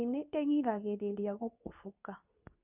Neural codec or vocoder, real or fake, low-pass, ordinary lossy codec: codec, 24 kHz, 0.9 kbps, WavTokenizer, medium speech release version 2; fake; 3.6 kHz; none